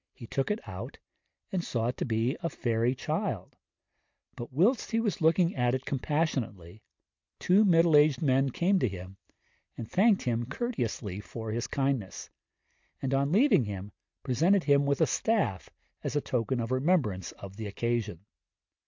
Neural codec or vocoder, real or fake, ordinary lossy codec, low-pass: none; real; MP3, 64 kbps; 7.2 kHz